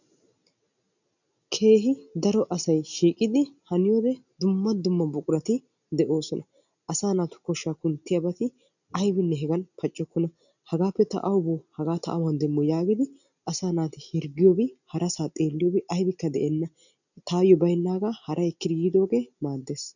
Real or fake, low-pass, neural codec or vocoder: real; 7.2 kHz; none